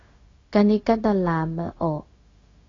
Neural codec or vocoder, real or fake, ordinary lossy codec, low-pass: codec, 16 kHz, 0.4 kbps, LongCat-Audio-Codec; fake; AAC, 32 kbps; 7.2 kHz